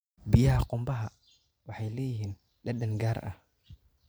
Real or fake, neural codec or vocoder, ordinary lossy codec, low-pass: fake; vocoder, 44.1 kHz, 128 mel bands every 512 samples, BigVGAN v2; none; none